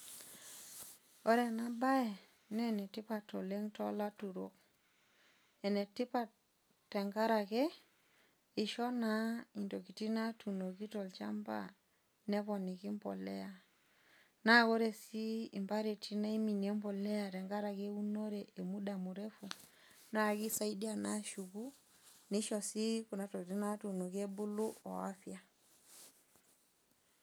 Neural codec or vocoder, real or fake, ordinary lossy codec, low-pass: none; real; none; none